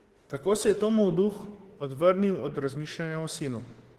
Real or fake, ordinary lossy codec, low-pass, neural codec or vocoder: fake; Opus, 16 kbps; 14.4 kHz; autoencoder, 48 kHz, 32 numbers a frame, DAC-VAE, trained on Japanese speech